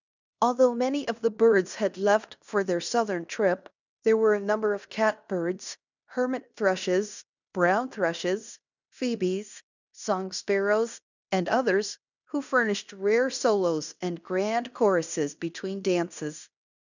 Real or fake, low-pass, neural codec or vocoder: fake; 7.2 kHz; codec, 16 kHz in and 24 kHz out, 0.9 kbps, LongCat-Audio-Codec, fine tuned four codebook decoder